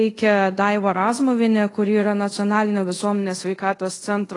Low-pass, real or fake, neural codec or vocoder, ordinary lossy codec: 10.8 kHz; fake; codec, 24 kHz, 0.5 kbps, DualCodec; AAC, 32 kbps